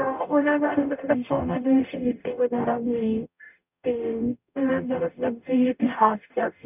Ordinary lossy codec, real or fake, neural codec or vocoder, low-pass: none; fake; codec, 44.1 kHz, 0.9 kbps, DAC; 3.6 kHz